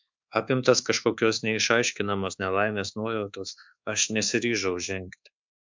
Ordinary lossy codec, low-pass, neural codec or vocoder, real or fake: MP3, 64 kbps; 7.2 kHz; codec, 24 kHz, 1.2 kbps, DualCodec; fake